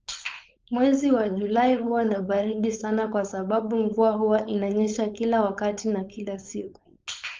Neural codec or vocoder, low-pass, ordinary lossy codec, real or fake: codec, 16 kHz, 4.8 kbps, FACodec; 7.2 kHz; Opus, 24 kbps; fake